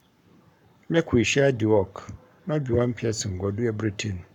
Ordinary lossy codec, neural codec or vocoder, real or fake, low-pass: none; codec, 44.1 kHz, 7.8 kbps, Pupu-Codec; fake; 19.8 kHz